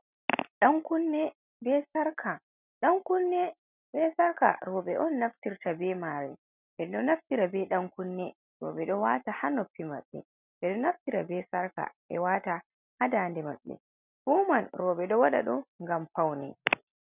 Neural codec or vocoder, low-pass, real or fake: none; 3.6 kHz; real